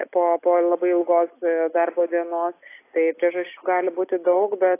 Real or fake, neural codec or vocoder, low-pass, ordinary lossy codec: real; none; 3.6 kHz; AAC, 24 kbps